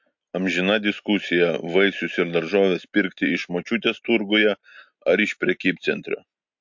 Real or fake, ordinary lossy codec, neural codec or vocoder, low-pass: real; MP3, 48 kbps; none; 7.2 kHz